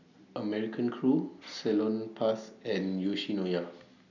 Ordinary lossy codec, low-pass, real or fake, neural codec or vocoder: none; 7.2 kHz; real; none